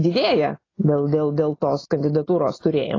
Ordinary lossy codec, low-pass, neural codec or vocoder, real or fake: AAC, 32 kbps; 7.2 kHz; none; real